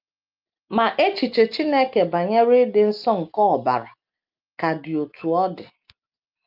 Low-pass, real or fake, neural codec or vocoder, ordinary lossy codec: 5.4 kHz; real; none; Opus, 24 kbps